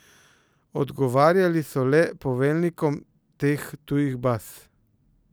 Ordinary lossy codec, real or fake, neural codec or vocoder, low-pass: none; real; none; none